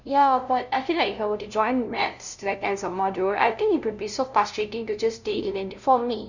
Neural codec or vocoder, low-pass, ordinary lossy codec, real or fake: codec, 16 kHz, 0.5 kbps, FunCodec, trained on LibriTTS, 25 frames a second; 7.2 kHz; none; fake